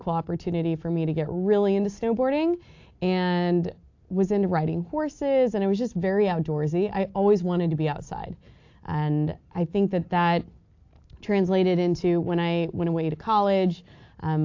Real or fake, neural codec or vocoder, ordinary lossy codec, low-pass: real; none; AAC, 48 kbps; 7.2 kHz